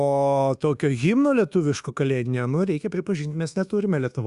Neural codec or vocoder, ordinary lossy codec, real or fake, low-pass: codec, 24 kHz, 1.2 kbps, DualCodec; Opus, 64 kbps; fake; 10.8 kHz